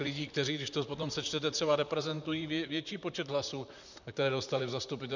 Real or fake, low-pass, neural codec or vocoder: fake; 7.2 kHz; vocoder, 44.1 kHz, 128 mel bands, Pupu-Vocoder